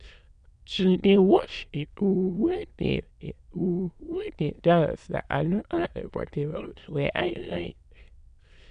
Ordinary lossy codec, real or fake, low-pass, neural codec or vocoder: none; fake; 9.9 kHz; autoencoder, 22.05 kHz, a latent of 192 numbers a frame, VITS, trained on many speakers